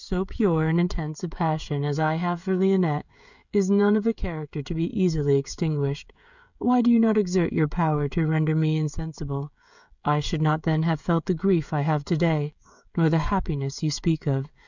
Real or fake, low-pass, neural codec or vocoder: fake; 7.2 kHz; codec, 16 kHz, 16 kbps, FreqCodec, smaller model